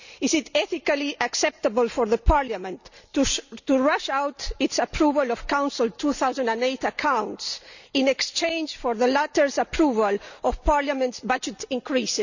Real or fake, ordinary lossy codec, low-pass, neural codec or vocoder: real; none; 7.2 kHz; none